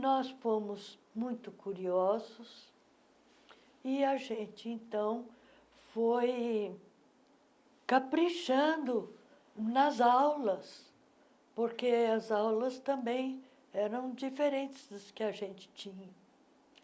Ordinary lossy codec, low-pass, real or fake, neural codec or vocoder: none; none; real; none